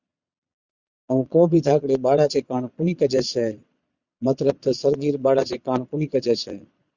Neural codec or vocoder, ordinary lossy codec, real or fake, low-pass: vocoder, 22.05 kHz, 80 mel bands, Vocos; Opus, 64 kbps; fake; 7.2 kHz